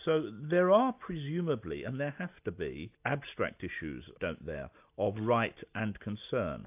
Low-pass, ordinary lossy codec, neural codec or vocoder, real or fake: 3.6 kHz; AAC, 32 kbps; none; real